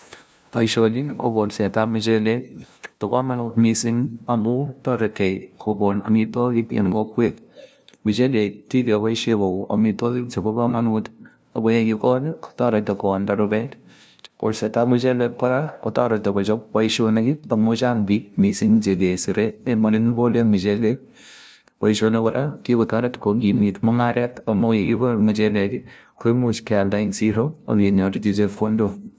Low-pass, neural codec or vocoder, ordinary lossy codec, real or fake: none; codec, 16 kHz, 0.5 kbps, FunCodec, trained on LibriTTS, 25 frames a second; none; fake